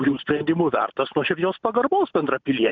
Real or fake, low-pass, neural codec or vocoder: real; 7.2 kHz; none